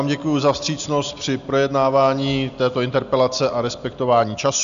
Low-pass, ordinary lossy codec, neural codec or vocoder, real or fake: 7.2 kHz; AAC, 96 kbps; none; real